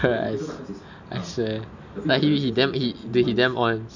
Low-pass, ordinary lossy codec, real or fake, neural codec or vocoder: 7.2 kHz; none; real; none